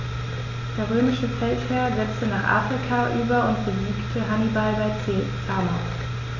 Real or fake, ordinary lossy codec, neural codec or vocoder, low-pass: real; none; none; 7.2 kHz